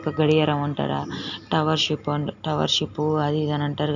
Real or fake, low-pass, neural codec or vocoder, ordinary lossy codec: real; 7.2 kHz; none; none